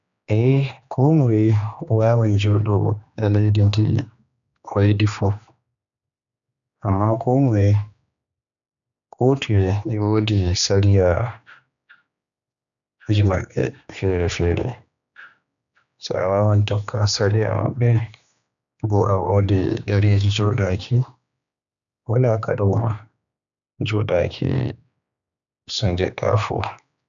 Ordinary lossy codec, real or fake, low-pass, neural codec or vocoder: none; fake; 7.2 kHz; codec, 16 kHz, 2 kbps, X-Codec, HuBERT features, trained on general audio